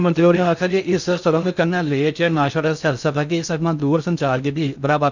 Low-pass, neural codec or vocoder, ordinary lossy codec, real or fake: 7.2 kHz; codec, 16 kHz in and 24 kHz out, 0.6 kbps, FocalCodec, streaming, 2048 codes; none; fake